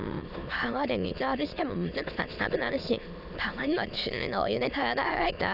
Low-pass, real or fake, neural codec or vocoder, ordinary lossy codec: 5.4 kHz; fake; autoencoder, 22.05 kHz, a latent of 192 numbers a frame, VITS, trained on many speakers; none